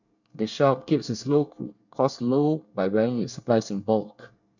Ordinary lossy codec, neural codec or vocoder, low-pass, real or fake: none; codec, 24 kHz, 1 kbps, SNAC; 7.2 kHz; fake